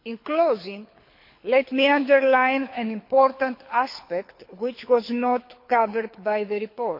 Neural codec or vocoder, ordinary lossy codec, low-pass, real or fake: codec, 24 kHz, 6 kbps, HILCodec; MP3, 32 kbps; 5.4 kHz; fake